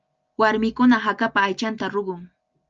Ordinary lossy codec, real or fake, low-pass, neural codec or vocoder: Opus, 24 kbps; real; 7.2 kHz; none